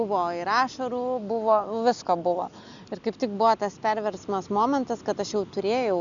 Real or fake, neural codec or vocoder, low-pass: real; none; 7.2 kHz